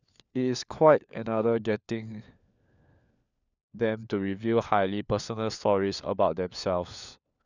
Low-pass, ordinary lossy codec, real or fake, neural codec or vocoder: 7.2 kHz; none; fake; codec, 16 kHz, 4 kbps, FunCodec, trained on LibriTTS, 50 frames a second